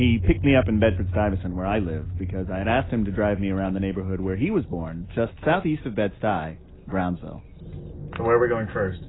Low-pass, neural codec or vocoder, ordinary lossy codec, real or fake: 7.2 kHz; none; AAC, 16 kbps; real